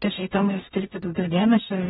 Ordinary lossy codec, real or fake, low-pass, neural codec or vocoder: AAC, 16 kbps; fake; 19.8 kHz; codec, 44.1 kHz, 0.9 kbps, DAC